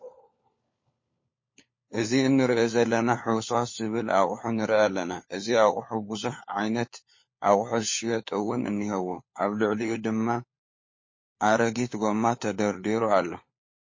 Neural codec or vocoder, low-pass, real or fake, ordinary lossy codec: codec, 16 kHz, 4 kbps, FunCodec, trained on LibriTTS, 50 frames a second; 7.2 kHz; fake; MP3, 32 kbps